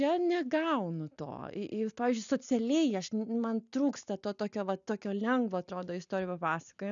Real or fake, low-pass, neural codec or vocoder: real; 7.2 kHz; none